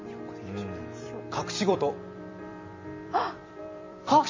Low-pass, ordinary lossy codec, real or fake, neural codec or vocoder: 7.2 kHz; MP3, 32 kbps; fake; vocoder, 44.1 kHz, 128 mel bands every 256 samples, BigVGAN v2